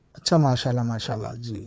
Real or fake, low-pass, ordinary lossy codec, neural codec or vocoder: fake; none; none; codec, 16 kHz, 4 kbps, FreqCodec, larger model